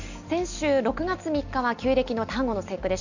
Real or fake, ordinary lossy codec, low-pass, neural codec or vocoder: real; none; 7.2 kHz; none